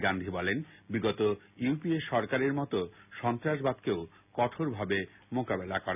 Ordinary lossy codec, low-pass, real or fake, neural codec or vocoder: none; 3.6 kHz; real; none